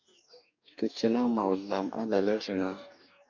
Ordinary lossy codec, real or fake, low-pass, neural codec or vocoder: MP3, 64 kbps; fake; 7.2 kHz; codec, 44.1 kHz, 2.6 kbps, DAC